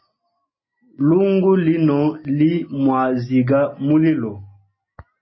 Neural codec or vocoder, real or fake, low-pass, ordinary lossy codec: none; real; 7.2 kHz; MP3, 24 kbps